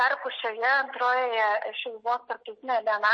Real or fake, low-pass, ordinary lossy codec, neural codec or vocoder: real; 9.9 kHz; MP3, 32 kbps; none